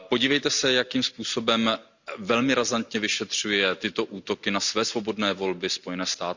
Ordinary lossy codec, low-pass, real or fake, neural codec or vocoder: Opus, 64 kbps; 7.2 kHz; real; none